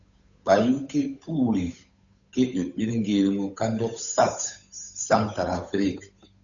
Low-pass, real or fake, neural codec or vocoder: 7.2 kHz; fake; codec, 16 kHz, 8 kbps, FunCodec, trained on Chinese and English, 25 frames a second